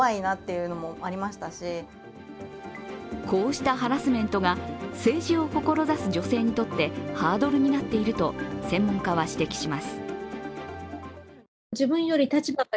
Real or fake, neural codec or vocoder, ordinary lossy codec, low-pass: real; none; none; none